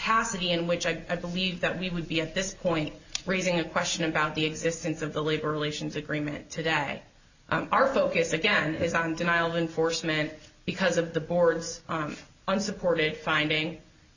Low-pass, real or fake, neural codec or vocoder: 7.2 kHz; real; none